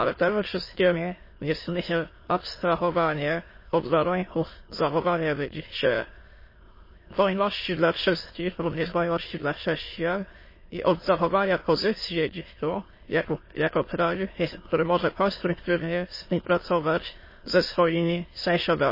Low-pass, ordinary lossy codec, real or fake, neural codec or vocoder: 5.4 kHz; MP3, 24 kbps; fake; autoencoder, 22.05 kHz, a latent of 192 numbers a frame, VITS, trained on many speakers